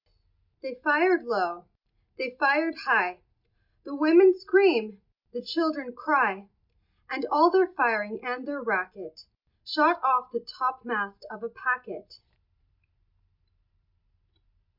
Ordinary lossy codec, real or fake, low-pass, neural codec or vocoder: MP3, 48 kbps; real; 5.4 kHz; none